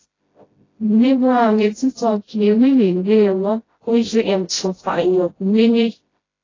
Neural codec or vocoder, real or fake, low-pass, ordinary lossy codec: codec, 16 kHz, 0.5 kbps, FreqCodec, smaller model; fake; 7.2 kHz; AAC, 32 kbps